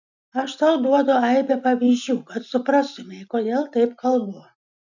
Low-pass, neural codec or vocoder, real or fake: 7.2 kHz; none; real